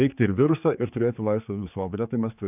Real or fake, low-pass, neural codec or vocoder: fake; 3.6 kHz; codec, 16 kHz, 4 kbps, X-Codec, HuBERT features, trained on general audio